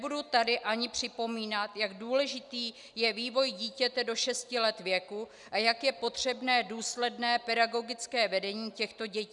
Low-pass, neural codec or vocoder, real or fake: 10.8 kHz; none; real